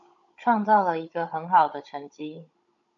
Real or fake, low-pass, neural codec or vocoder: fake; 7.2 kHz; codec, 16 kHz, 16 kbps, FunCodec, trained on Chinese and English, 50 frames a second